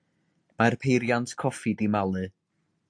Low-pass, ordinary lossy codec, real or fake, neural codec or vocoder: 9.9 kHz; AAC, 64 kbps; real; none